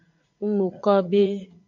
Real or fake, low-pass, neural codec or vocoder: fake; 7.2 kHz; vocoder, 44.1 kHz, 80 mel bands, Vocos